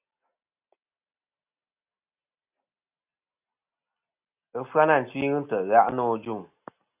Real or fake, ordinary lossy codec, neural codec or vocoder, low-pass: real; AAC, 32 kbps; none; 3.6 kHz